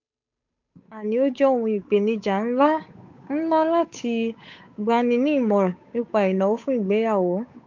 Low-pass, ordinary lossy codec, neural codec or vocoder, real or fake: 7.2 kHz; MP3, 64 kbps; codec, 16 kHz, 8 kbps, FunCodec, trained on Chinese and English, 25 frames a second; fake